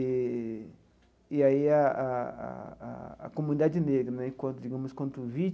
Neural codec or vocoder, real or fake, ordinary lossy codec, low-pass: none; real; none; none